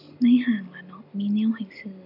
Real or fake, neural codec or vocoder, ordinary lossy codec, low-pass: real; none; none; 5.4 kHz